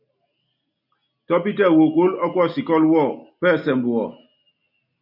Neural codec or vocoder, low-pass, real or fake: none; 5.4 kHz; real